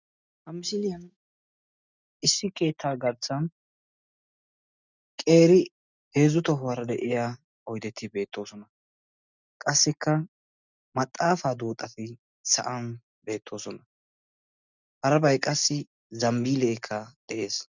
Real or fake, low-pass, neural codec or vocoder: real; 7.2 kHz; none